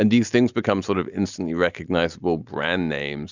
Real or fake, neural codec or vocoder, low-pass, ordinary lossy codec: real; none; 7.2 kHz; Opus, 64 kbps